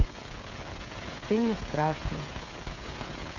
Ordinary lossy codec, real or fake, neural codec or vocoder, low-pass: none; fake; codec, 16 kHz, 16 kbps, FreqCodec, smaller model; 7.2 kHz